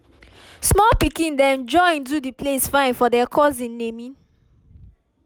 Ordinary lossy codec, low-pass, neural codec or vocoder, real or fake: none; none; none; real